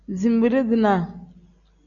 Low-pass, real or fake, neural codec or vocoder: 7.2 kHz; real; none